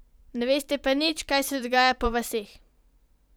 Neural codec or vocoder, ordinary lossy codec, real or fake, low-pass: vocoder, 44.1 kHz, 128 mel bands every 512 samples, BigVGAN v2; none; fake; none